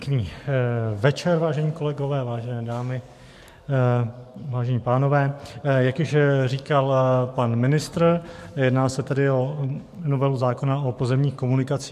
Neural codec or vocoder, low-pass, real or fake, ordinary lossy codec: codec, 44.1 kHz, 7.8 kbps, DAC; 14.4 kHz; fake; MP3, 64 kbps